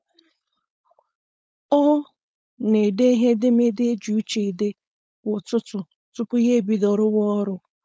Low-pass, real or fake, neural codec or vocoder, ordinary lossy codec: none; fake; codec, 16 kHz, 4.8 kbps, FACodec; none